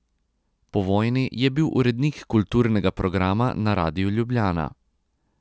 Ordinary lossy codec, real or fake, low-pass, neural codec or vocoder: none; real; none; none